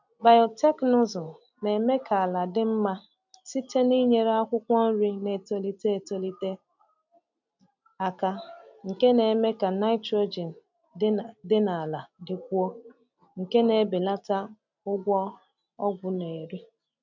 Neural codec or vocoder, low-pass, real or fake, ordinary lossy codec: none; 7.2 kHz; real; none